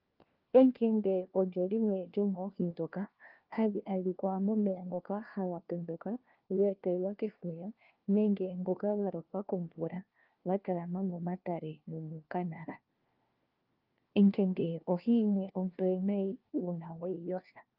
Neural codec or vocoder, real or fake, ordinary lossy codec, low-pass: codec, 16 kHz, 1 kbps, FunCodec, trained on LibriTTS, 50 frames a second; fake; Opus, 32 kbps; 5.4 kHz